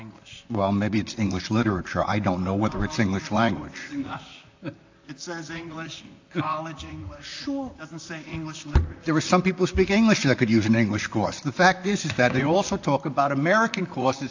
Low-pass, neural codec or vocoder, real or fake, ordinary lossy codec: 7.2 kHz; vocoder, 44.1 kHz, 128 mel bands, Pupu-Vocoder; fake; AAC, 48 kbps